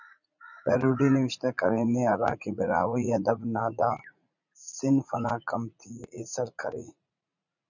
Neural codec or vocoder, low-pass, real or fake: vocoder, 44.1 kHz, 80 mel bands, Vocos; 7.2 kHz; fake